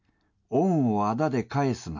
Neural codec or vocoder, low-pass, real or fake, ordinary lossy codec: none; 7.2 kHz; real; none